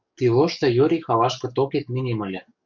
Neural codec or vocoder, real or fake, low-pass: codec, 44.1 kHz, 7.8 kbps, DAC; fake; 7.2 kHz